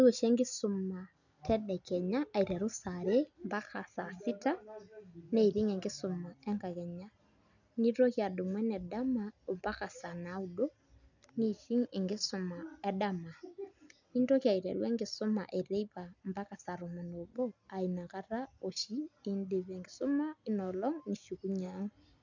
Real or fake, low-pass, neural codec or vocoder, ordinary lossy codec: real; 7.2 kHz; none; none